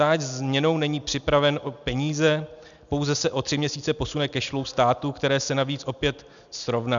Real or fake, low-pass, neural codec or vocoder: real; 7.2 kHz; none